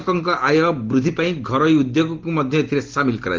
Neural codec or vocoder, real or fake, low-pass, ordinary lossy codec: none; real; 7.2 kHz; Opus, 16 kbps